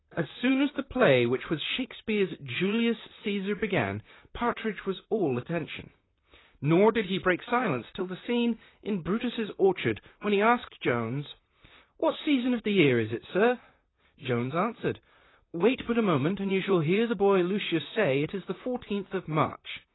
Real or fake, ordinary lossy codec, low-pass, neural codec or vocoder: fake; AAC, 16 kbps; 7.2 kHz; vocoder, 44.1 kHz, 128 mel bands, Pupu-Vocoder